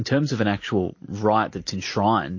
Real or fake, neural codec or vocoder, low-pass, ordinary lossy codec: real; none; 7.2 kHz; MP3, 32 kbps